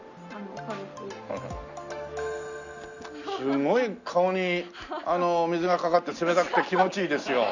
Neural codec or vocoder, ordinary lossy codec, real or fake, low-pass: none; none; real; 7.2 kHz